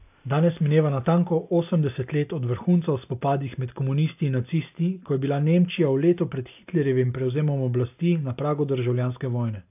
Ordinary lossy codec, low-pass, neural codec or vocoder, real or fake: AAC, 32 kbps; 3.6 kHz; none; real